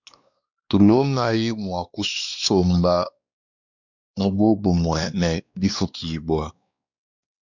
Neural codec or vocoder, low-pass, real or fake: codec, 16 kHz, 2 kbps, X-Codec, WavLM features, trained on Multilingual LibriSpeech; 7.2 kHz; fake